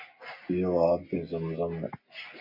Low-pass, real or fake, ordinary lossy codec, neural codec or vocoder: 5.4 kHz; real; MP3, 32 kbps; none